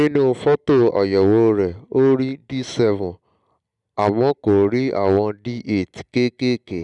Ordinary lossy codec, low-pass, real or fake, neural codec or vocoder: none; 10.8 kHz; real; none